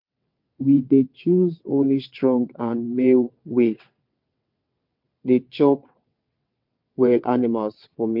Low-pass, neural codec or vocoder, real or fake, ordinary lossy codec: 5.4 kHz; vocoder, 22.05 kHz, 80 mel bands, WaveNeXt; fake; MP3, 48 kbps